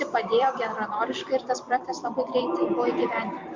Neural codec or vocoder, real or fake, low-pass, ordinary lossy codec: vocoder, 44.1 kHz, 128 mel bands every 256 samples, BigVGAN v2; fake; 7.2 kHz; MP3, 48 kbps